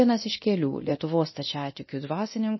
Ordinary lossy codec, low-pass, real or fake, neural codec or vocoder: MP3, 24 kbps; 7.2 kHz; fake; codec, 24 kHz, 0.9 kbps, DualCodec